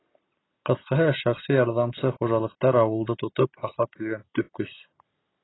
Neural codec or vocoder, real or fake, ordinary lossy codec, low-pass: none; real; AAC, 16 kbps; 7.2 kHz